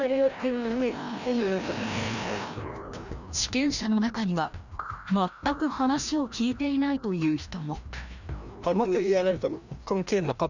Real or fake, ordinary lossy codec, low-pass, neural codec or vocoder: fake; none; 7.2 kHz; codec, 16 kHz, 1 kbps, FreqCodec, larger model